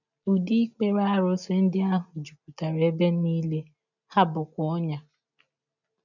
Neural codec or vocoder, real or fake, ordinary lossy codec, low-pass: none; real; none; 7.2 kHz